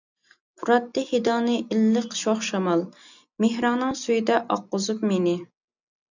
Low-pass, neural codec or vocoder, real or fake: 7.2 kHz; none; real